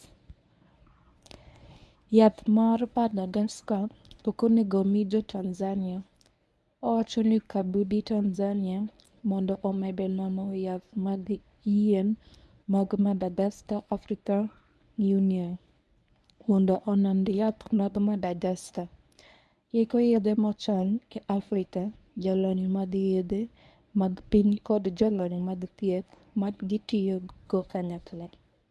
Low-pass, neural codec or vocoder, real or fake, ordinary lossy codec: none; codec, 24 kHz, 0.9 kbps, WavTokenizer, medium speech release version 1; fake; none